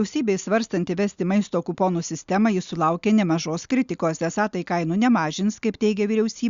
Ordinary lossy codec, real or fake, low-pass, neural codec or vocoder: Opus, 64 kbps; real; 7.2 kHz; none